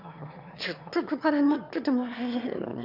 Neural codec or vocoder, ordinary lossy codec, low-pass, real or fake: autoencoder, 22.05 kHz, a latent of 192 numbers a frame, VITS, trained on one speaker; none; 5.4 kHz; fake